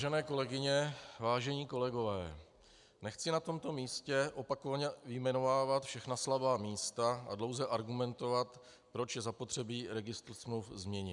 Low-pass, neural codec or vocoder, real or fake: 10.8 kHz; none; real